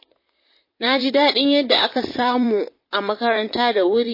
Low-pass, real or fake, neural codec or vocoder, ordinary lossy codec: 5.4 kHz; fake; vocoder, 44.1 kHz, 128 mel bands, Pupu-Vocoder; MP3, 24 kbps